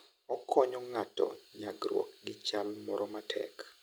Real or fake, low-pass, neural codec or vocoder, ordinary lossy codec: real; none; none; none